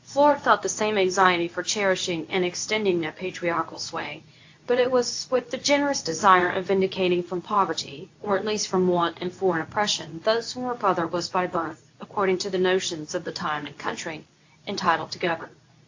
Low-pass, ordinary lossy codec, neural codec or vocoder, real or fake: 7.2 kHz; AAC, 48 kbps; codec, 24 kHz, 0.9 kbps, WavTokenizer, medium speech release version 2; fake